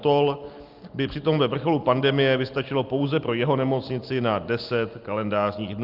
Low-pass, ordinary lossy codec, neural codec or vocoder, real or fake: 5.4 kHz; Opus, 32 kbps; none; real